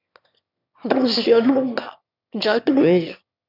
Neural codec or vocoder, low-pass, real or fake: autoencoder, 22.05 kHz, a latent of 192 numbers a frame, VITS, trained on one speaker; 5.4 kHz; fake